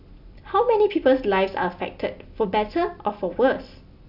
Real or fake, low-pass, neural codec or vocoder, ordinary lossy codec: real; 5.4 kHz; none; none